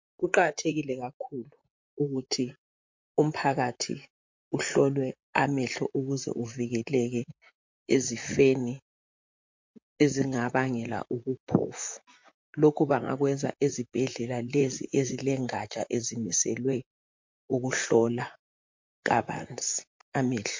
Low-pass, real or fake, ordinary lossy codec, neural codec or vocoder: 7.2 kHz; fake; MP3, 48 kbps; vocoder, 44.1 kHz, 128 mel bands, Pupu-Vocoder